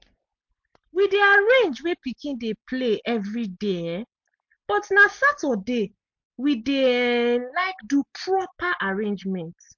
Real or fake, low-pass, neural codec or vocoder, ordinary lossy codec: real; 7.2 kHz; none; MP3, 48 kbps